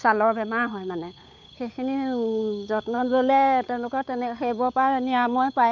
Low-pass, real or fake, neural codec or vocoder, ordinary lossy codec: 7.2 kHz; fake; codec, 16 kHz, 8 kbps, FunCodec, trained on Chinese and English, 25 frames a second; none